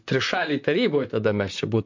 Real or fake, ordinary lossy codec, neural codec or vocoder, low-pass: fake; MP3, 48 kbps; vocoder, 44.1 kHz, 128 mel bands, Pupu-Vocoder; 7.2 kHz